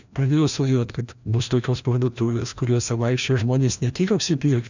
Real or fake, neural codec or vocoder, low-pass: fake; codec, 16 kHz, 1 kbps, FreqCodec, larger model; 7.2 kHz